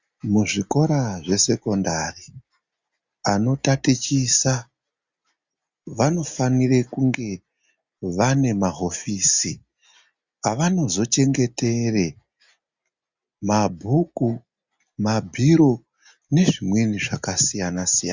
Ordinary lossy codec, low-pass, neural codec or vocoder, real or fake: Opus, 64 kbps; 7.2 kHz; none; real